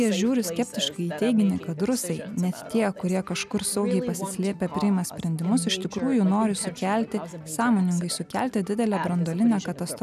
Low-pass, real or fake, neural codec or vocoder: 14.4 kHz; real; none